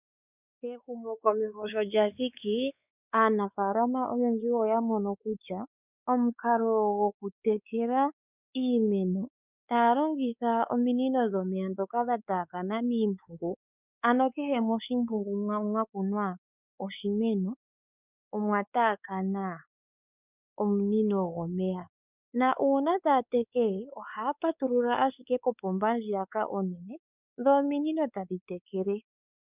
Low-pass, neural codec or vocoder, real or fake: 3.6 kHz; codec, 16 kHz, 4 kbps, X-Codec, WavLM features, trained on Multilingual LibriSpeech; fake